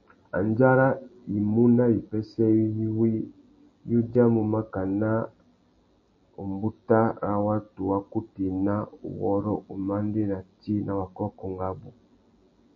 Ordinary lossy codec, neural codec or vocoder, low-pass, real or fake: MP3, 32 kbps; none; 7.2 kHz; real